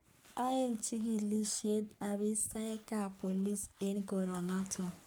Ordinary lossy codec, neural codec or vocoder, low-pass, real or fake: none; codec, 44.1 kHz, 3.4 kbps, Pupu-Codec; none; fake